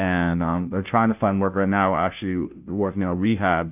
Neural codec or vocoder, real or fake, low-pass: codec, 16 kHz, 0.5 kbps, FunCodec, trained on Chinese and English, 25 frames a second; fake; 3.6 kHz